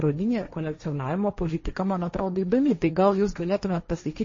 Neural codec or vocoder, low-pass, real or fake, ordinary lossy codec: codec, 16 kHz, 1.1 kbps, Voila-Tokenizer; 7.2 kHz; fake; MP3, 32 kbps